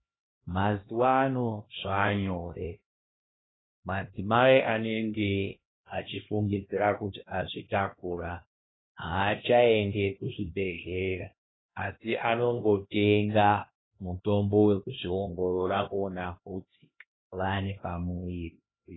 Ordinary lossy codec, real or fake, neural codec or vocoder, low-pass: AAC, 16 kbps; fake; codec, 16 kHz, 1 kbps, X-Codec, HuBERT features, trained on LibriSpeech; 7.2 kHz